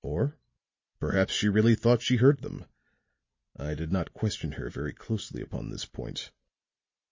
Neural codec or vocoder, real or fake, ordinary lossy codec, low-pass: none; real; MP3, 32 kbps; 7.2 kHz